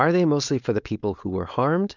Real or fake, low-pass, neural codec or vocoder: fake; 7.2 kHz; codec, 16 kHz, 4.8 kbps, FACodec